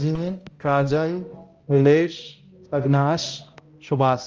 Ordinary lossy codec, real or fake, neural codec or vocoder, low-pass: Opus, 24 kbps; fake; codec, 16 kHz, 0.5 kbps, X-Codec, HuBERT features, trained on balanced general audio; 7.2 kHz